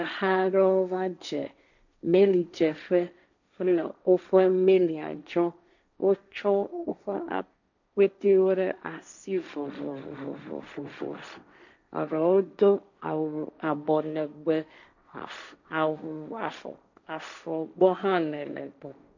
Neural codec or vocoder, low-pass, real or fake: codec, 16 kHz, 1.1 kbps, Voila-Tokenizer; 7.2 kHz; fake